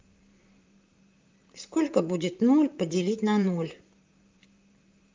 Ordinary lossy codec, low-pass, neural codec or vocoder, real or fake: Opus, 24 kbps; 7.2 kHz; vocoder, 44.1 kHz, 128 mel bands, Pupu-Vocoder; fake